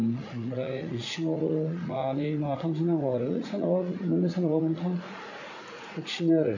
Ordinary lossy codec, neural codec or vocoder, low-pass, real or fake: none; vocoder, 44.1 kHz, 80 mel bands, Vocos; 7.2 kHz; fake